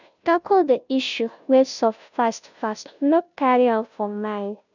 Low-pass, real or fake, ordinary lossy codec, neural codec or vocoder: 7.2 kHz; fake; none; codec, 16 kHz, 0.5 kbps, FunCodec, trained on Chinese and English, 25 frames a second